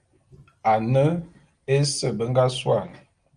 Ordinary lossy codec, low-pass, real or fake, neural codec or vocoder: Opus, 32 kbps; 9.9 kHz; real; none